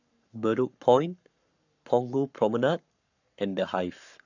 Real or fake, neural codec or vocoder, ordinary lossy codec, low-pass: fake; codec, 44.1 kHz, 7.8 kbps, Pupu-Codec; none; 7.2 kHz